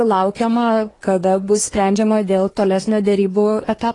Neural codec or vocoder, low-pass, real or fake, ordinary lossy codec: codec, 24 kHz, 1 kbps, SNAC; 10.8 kHz; fake; AAC, 32 kbps